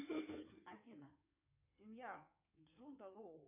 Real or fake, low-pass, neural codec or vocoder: fake; 3.6 kHz; codec, 16 kHz in and 24 kHz out, 2.2 kbps, FireRedTTS-2 codec